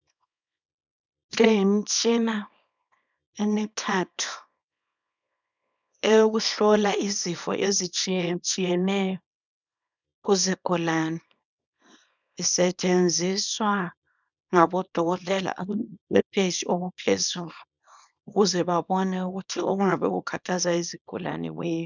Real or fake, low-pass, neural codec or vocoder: fake; 7.2 kHz; codec, 24 kHz, 0.9 kbps, WavTokenizer, small release